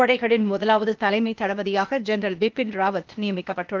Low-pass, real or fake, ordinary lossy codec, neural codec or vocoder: 7.2 kHz; fake; Opus, 32 kbps; codec, 16 kHz, 0.8 kbps, ZipCodec